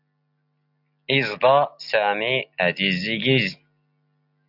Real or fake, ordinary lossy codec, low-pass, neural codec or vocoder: real; AAC, 48 kbps; 5.4 kHz; none